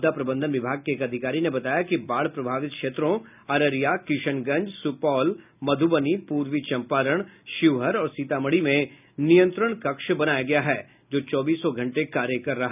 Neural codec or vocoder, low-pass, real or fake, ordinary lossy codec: none; 3.6 kHz; real; none